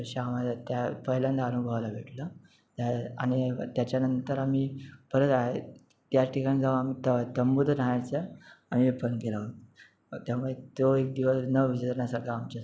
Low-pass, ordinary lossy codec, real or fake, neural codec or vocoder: none; none; real; none